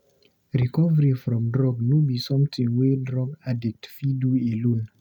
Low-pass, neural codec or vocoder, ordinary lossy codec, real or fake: 19.8 kHz; none; none; real